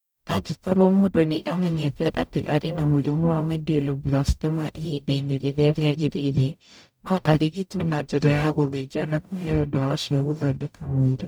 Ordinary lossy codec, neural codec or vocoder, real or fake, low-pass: none; codec, 44.1 kHz, 0.9 kbps, DAC; fake; none